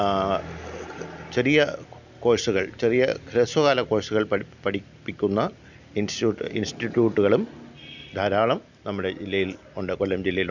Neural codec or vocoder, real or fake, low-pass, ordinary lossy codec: none; real; 7.2 kHz; none